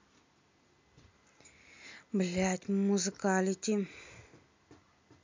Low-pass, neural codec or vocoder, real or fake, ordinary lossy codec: 7.2 kHz; none; real; MP3, 64 kbps